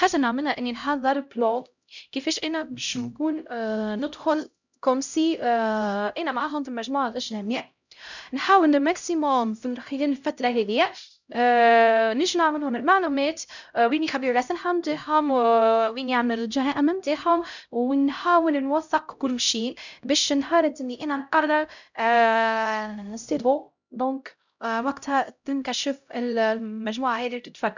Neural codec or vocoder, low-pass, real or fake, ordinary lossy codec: codec, 16 kHz, 0.5 kbps, X-Codec, HuBERT features, trained on LibriSpeech; 7.2 kHz; fake; none